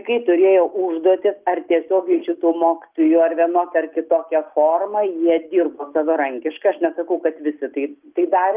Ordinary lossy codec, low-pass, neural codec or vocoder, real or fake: Opus, 24 kbps; 3.6 kHz; none; real